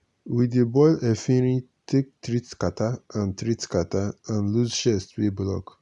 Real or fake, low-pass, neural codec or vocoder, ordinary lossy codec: real; 10.8 kHz; none; none